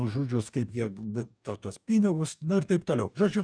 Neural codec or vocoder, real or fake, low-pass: codec, 44.1 kHz, 2.6 kbps, DAC; fake; 9.9 kHz